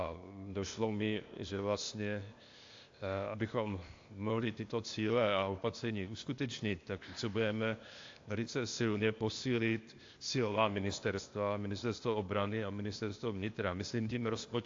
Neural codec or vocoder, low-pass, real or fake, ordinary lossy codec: codec, 16 kHz, 0.8 kbps, ZipCodec; 7.2 kHz; fake; AAC, 48 kbps